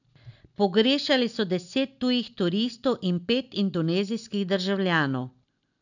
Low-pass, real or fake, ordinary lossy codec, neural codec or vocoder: 7.2 kHz; real; none; none